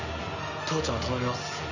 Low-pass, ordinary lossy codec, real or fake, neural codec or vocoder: 7.2 kHz; none; real; none